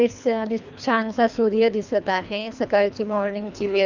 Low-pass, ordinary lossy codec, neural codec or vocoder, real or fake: 7.2 kHz; none; codec, 24 kHz, 3 kbps, HILCodec; fake